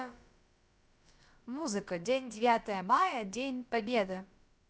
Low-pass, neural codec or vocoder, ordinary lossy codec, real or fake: none; codec, 16 kHz, about 1 kbps, DyCAST, with the encoder's durations; none; fake